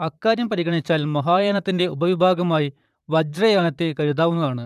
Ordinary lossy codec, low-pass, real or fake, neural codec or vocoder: none; 14.4 kHz; fake; vocoder, 44.1 kHz, 128 mel bands, Pupu-Vocoder